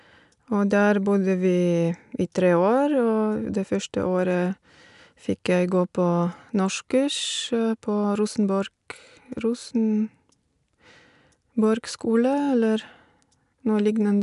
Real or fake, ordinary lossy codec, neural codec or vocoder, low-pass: real; none; none; 10.8 kHz